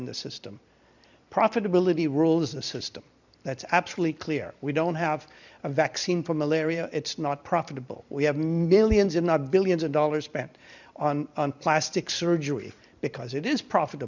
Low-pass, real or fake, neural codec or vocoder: 7.2 kHz; fake; vocoder, 44.1 kHz, 128 mel bands every 256 samples, BigVGAN v2